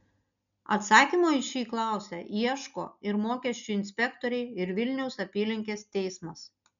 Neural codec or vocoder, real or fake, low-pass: none; real; 7.2 kHz